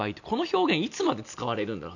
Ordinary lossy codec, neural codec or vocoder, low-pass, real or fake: none; none; 7.2 kHz; real